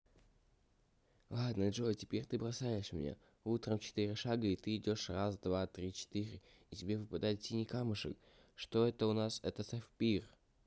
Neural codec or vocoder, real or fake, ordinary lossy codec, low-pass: none; real; none; none